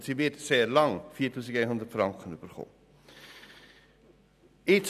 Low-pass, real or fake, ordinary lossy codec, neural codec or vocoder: 14.4 kHz; real; none; none